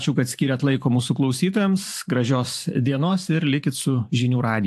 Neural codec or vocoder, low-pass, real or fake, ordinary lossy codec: vocoder, 44.1 kHz, 128 mel bands every 512 samples, BigVGAN v2; 14.4 kHz; fake; AAC, 64 kbps